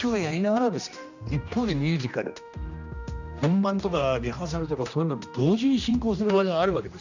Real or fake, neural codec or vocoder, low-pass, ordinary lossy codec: fake; codec, 16 kHz, 1 kbps, X-Codec, HuBERT features, trained on general audio; 7.2 kHz; none